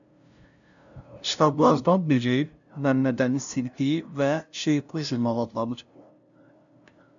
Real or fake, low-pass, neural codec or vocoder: fake; 7.2 kHz; codec, 16 kHz, 0.5 kbps, FunCodec, trained on LibriTTS, 25 frames a second